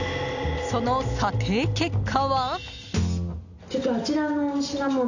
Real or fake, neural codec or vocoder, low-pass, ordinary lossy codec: real; none; 7.2 kHz; none